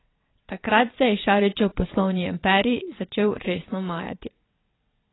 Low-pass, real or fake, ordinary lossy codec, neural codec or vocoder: 7.2 kHz; fake; AAC, 16 kbps; vocoder, 22.05 kHz, 80 mel bands, WaveNeXt